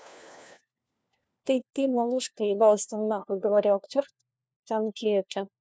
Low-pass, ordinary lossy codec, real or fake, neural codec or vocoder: none; none; fake; codec, 16 kHz, 1 kbps, FunCodec, trained on LibriTTS, 50 frames a second